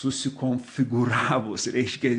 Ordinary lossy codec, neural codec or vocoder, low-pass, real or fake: AAC, 48 kbps; none; 9.9 kHz; real